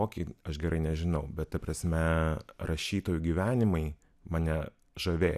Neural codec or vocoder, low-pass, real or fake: none; 14.4 kHz; real